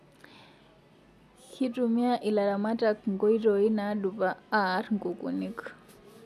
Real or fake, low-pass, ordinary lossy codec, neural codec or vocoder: real; 14.4 kHz; none; none